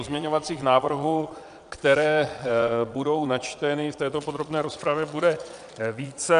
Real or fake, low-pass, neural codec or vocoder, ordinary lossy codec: fake; 9.9 kHz; vocoder, 22.05 kHz, 80 mel bands, Vocos; MP3, 96 kbps